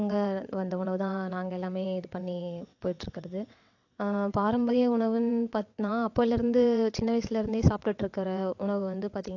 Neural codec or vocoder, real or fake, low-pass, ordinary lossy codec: vocoder, 22.05 kHz, 80 mel bands, WaveNeXt; fake; 7.2 kHz; AAC, 48 kbps